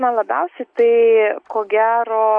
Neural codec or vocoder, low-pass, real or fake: none; 9.9 kHz; real